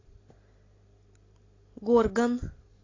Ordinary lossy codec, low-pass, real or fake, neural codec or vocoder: AAC, 32 kbps; 7.2 kHz; real; none